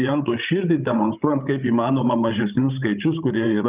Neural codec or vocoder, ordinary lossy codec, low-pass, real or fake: codec, 16 kHz, 16 kbps, FreqCodec, larger model; Opus, 24 kbps; 3.6 kHz; fake